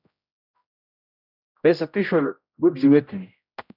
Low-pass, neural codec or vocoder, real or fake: 5.4 kHz; codec, 16 kHz, 0.5 kbps, X-Codec, HuBERT features, trained on general audio; fake